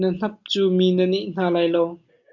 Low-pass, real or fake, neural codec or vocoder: 7.2 kHz; real; none